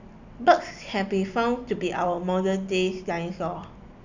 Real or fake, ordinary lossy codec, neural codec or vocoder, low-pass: real; none; none; 7.2 kHz